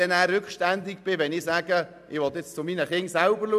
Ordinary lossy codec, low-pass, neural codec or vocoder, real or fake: none; 14.4 kHz; none; real